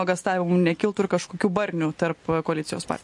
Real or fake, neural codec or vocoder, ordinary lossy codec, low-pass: real; none; MP3, 48 kbps; 10.8 kHz